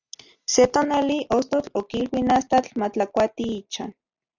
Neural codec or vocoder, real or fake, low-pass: none; real; 7.2 kHz